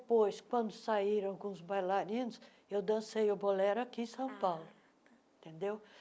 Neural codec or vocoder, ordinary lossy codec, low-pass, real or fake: none; none; none; real